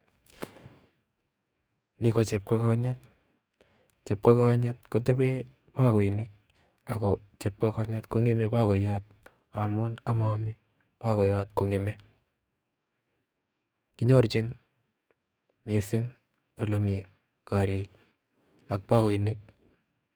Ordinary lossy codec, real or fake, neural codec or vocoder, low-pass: none; fake; codec, 44.1 kHz, 2.6 kbps, DAC; none